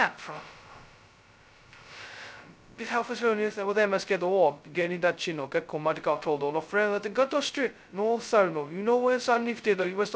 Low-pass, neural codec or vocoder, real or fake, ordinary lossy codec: none; codec, 16 kHz, 0.2 kbps, FocalCodec; fake; none